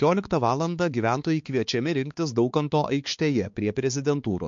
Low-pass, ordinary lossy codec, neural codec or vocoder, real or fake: 7.2 kHz; MP3, 48 kbps; codec, 16 kHz, 4 kbps, X-Codec, HuBERT features, trained on balanced general audio; fake